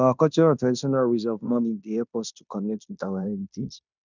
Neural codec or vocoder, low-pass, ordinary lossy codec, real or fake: codec, 16 kHz in and 24 kHz out, 0.9 kbps, LongCat-Audio-Codec, fine tuned four codebook decoder; 7.2 kHz; none; fake